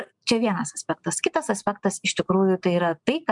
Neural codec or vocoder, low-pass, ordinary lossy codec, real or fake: none; 10.8 kHz; MP3, 96 kbps; real